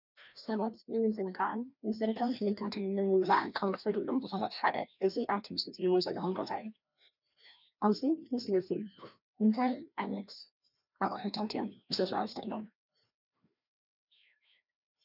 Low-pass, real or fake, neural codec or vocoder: 5.4 kHz; fake; codec, 16 kHz, 1 kbps, FreqCodec, larger model